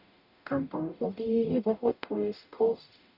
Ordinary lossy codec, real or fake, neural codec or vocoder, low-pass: none; fake; codec, 44.1 kHz, 0.9 kbps, DAC; 5.4 kHz